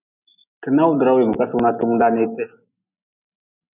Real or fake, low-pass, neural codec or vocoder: real; 3.6 kHz; none